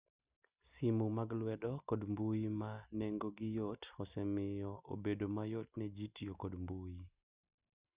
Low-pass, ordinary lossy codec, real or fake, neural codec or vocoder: 3.6 kHz; Opus, 64 kbps; real; none